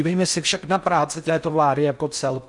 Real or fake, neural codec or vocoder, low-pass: fake; codec, 16 kHz in and 24 kHz out, 0.6 kbps, FocalCodec, streaming, 4096 codes; 10.8 kHz